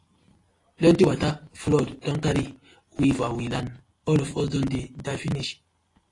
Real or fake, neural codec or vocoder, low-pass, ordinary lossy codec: real; none; 10.8 kHz; AAC, 32 kbps